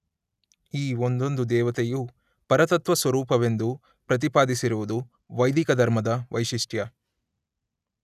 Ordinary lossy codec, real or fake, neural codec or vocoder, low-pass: none; real; none; 14.4 kHz